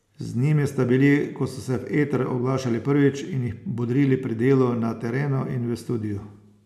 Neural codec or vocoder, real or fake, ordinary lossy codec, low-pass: none; real; none; 14.4 kHz